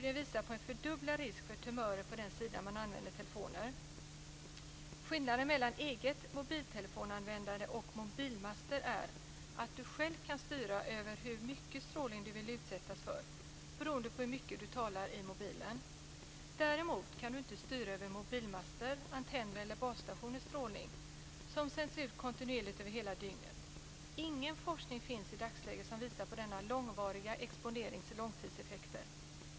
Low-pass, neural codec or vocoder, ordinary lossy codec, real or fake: none; none; none; real